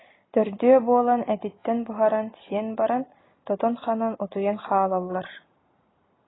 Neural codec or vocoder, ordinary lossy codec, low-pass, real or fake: none; AAC, 16 kbps; 7.2 kHz; real